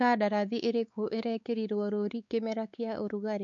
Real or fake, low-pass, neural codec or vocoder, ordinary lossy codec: fake; 7.2 kHz; codec, 16 kHz, 4 kbps, X-Codec, WavLM features, trained on Multilingual LibriSpeech; none